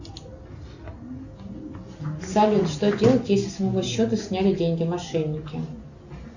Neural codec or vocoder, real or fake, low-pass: none; real; 7.2 kHz